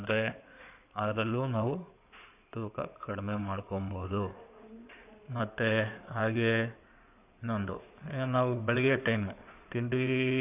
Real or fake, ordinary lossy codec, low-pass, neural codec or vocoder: fake; none; 3.6 kHz; codec, 16 kHz in and 24 kHz out, 2.2 kbps, FireRedTTS-2 codec